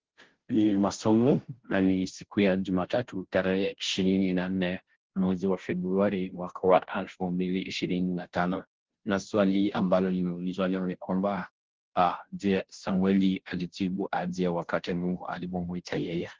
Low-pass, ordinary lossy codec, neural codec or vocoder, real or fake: 7.2 kHz; Opus, 16 kbps; codec, 16 kHz, 0.5 kbps, FunCodec, trained on Chinese and English, 25 frames a second; fake